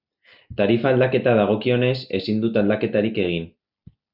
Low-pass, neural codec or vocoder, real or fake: 5.4 kHz; none; real